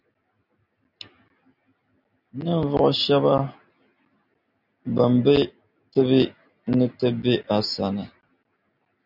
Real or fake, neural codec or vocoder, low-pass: real; none; 5.4 kHz